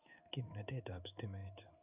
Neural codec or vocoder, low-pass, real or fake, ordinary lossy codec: codec, 16 kHz in and 24 kHz out, 1 kbps, XY-Tokenizer; 3.6 kHz; fake; none